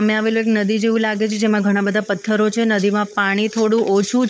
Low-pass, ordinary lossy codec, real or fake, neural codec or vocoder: none; none; fake; codec, 16 kHz, 16 kbps, FunCodec, trained on LibriTTS, 50 frames a second